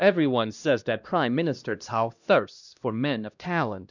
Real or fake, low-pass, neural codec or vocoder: fake; 7.2 kHz; codec, 16 kHz, 1 kbps, X-Codec, HuBERT features, trained on LibriSpeech